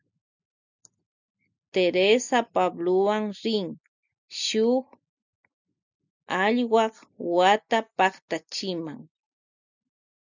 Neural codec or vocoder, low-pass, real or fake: none; 7.2 kHz; real